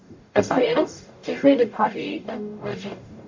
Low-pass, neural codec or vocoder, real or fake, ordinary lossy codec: 7.2 kHz; codec, 44.1 kHz, 0.9 kbps, DAC; fake; MP3, 48 kbps